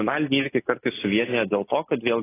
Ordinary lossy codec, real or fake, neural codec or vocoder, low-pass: AAC, 16 kbps; real; none; 3.6 kHz